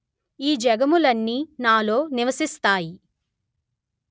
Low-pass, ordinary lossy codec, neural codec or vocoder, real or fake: none; none; none; real